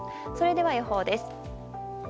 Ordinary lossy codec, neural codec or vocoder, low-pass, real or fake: none; none; none; real